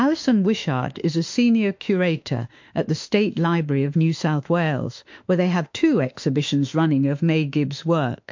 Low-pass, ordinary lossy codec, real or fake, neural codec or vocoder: 7.2 kHz; MP3, 48 kbps; fake; autoencoder, 48 kHz, 32 numbers a frame, DAC-VAE, trained on Japanese speech